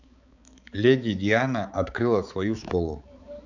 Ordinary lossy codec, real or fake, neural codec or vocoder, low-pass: none; fake; codec, 16 kHz, 4 kbps, X-Codec, HuBERT features, trained on balanced general audio; 7.2 kHz